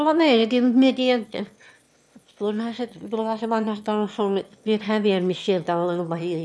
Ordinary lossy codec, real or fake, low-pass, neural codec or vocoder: none; fake; none; autoencoder, 22.05 kHz, a latent of 192 numbers a frame, VITS, trained on one speaker